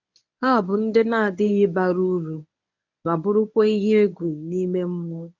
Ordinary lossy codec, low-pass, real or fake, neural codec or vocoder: none; 7.2 kHz; fake; codec, 24 kHz, 0.9 kbps, WavTokenizer, medium speech release version 1